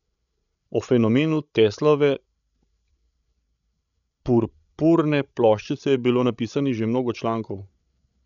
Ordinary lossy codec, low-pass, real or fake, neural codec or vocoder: none; 7.2 kHz; fake; codec, 16 kHz, 16 kbps, FreqCodec, larger model